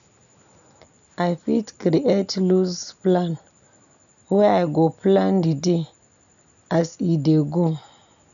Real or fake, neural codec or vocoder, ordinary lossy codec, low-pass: real; none; none; 7.2 kHz